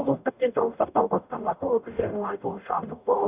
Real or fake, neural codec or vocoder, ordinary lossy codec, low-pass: fake; codec, 44.1 kHz, 0.9 kbps, DAC; none; 3.6 kHz